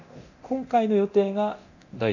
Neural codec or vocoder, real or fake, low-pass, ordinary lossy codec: codec, 24 kHz, 0.9 kbps, DualCodec; fake; 7.2 kHz; none